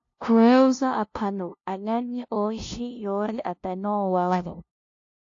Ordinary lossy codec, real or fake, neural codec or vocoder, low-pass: AAC, 48 kbps; fake; codec, 16 kHz, 0.5 kbps, FunCodec, trained on LibriTTS, 25 frames a second; 7.2 kHz